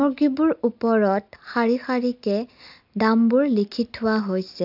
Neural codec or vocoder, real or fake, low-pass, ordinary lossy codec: none; real; 5.4 kHz; AAC, 48 kbps